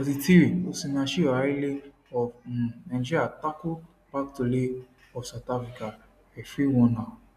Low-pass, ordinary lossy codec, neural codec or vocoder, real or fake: 14.4 kHz; none; none; real